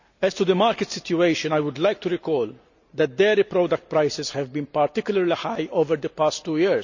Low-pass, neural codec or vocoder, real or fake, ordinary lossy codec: 7.2 kHz; none; real; none